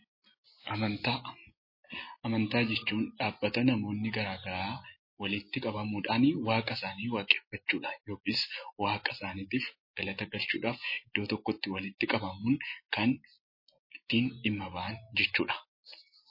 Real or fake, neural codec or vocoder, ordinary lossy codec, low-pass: real; none; MP3, 24 kbps; 5.4 kHz